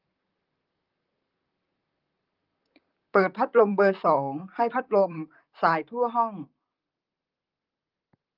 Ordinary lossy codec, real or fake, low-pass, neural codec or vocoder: Opus, 32 kbps; fake; 5.4 kHz; vocoder, 44.1 kHz, 128 mel bands, Pupu-Vocoder